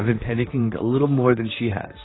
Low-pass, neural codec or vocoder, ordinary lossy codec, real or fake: 7.2 kHz; vocoder, 44.1 kHz, 128 mel bands, Pupu-Vocoder; AAC, 16 kbps; fake